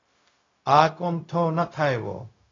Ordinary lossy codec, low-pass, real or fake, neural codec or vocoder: AAC, 32 kbps; 7.2 kHz; fake; codec, 16 kHz, 0.4 kbps, LongCat-Audio-Codec